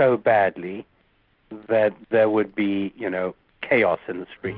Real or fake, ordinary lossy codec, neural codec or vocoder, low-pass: real; Opus, 24 kbps; none; 5.4 kHz